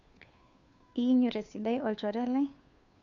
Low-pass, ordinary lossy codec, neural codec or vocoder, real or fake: 7.2 kHz; none; codec, 16 kHz, 2 kbps, FunCodec, trained on Chinese and English, 25 frames a second; fake